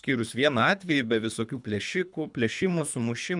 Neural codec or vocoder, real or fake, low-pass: codec, 24 kHz, 3 kbps, HILCodec; fake; 10.8 kHz